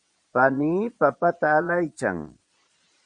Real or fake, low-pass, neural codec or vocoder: fake; 9.9 kHz; vocoder, 22.05 kHz, 80 mel bands, Vocos